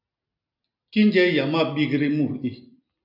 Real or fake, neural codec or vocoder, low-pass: real; none; 5.4 kHz